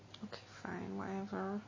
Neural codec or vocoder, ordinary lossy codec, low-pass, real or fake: none; MP3, 32 kbps; 7.2 kHz; real